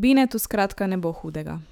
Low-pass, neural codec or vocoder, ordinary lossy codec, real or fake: 19.8 kHz; autoencoder, 48 kHz, 128 numbers a frame, DAC-VAE, trained on Japanese speech; none; fake